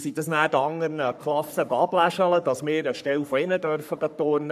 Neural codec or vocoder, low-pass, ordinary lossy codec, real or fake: codec, 44.1 kHz, 3.4 kbps, Pupu-Codec; 14.4 kHz; none; fake